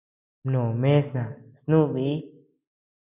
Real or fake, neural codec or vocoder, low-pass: real; none; 3.6 kHz